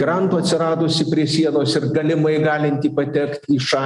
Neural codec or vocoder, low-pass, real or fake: none; 10.8 kHz; real